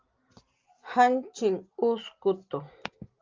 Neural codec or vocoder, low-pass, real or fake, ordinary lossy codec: none; 7.2 kHz; real; Opus, 24 kbps